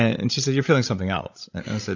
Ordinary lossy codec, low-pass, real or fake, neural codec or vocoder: AAC, 48 kbps; 7.2 kHz; fake; codec, 16 kHz, 16 kbps, FreqCodec, larger model